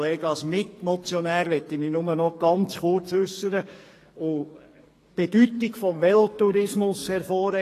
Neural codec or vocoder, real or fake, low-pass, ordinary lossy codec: codec, 44.1 kHz, 2.6 kbps, SNAC; fake; 14.4 kHz; AAC, 48 kbps